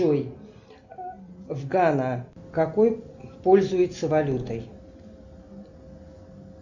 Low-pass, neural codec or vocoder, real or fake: 7.2 kHz; none; real